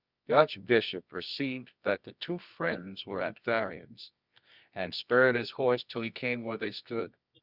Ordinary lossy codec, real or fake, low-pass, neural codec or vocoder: Opus, 64 kbps; fake; 5.4 kHz; codec, 24 kHz, 0.9 kbps, WavTokenizer, medium music audio release